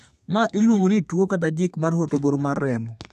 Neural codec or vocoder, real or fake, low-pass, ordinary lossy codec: codec, 32 kHz, 1.9 kbps, SNAC; fake; 14.4 kHz; none